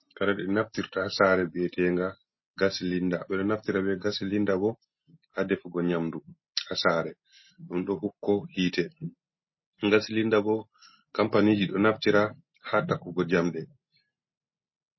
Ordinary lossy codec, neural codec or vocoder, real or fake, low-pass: MP3, 24 kbps; none; real; 7.2 kHz